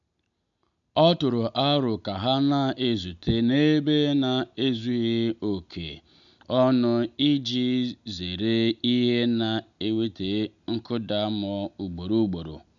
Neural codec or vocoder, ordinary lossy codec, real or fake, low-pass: none; none; real; 7.2 kHz